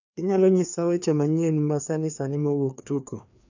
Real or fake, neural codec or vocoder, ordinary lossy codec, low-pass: fake; codec, 16 kHz, 2 kbps, FreqCodec, larger model; none; 7.2 kHz